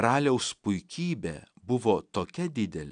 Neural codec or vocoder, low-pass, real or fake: none; 9.9 kHz; real